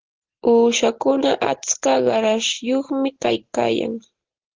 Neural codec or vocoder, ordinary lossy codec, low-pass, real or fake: none; Opus, 16 kbps; 7.2 kHz; real